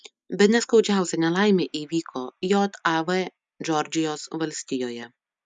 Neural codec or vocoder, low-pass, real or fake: none; 9.9 kHz; real